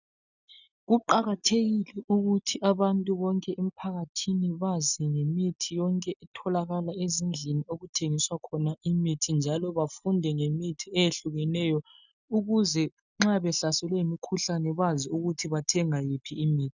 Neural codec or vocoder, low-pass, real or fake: none; 7.2 kHz; real